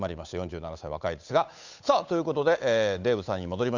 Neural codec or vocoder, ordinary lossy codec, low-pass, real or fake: codec, 16 kHz, 8 kbps, FunCodec, trained on Chinese and English, 25 frames a second; Opus, 64 kbps; 7.2 kHz; fake